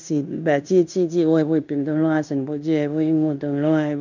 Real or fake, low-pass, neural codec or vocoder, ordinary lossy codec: fake; 7.2 kHz; codec, 16 kHz in and 24 kHz out, 0.9 kbps, LongCat-Audio-Codec, fine tuned four codebook decoder; none